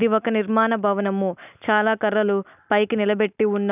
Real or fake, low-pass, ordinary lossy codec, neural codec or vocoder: real; 3.6 kHz; none; none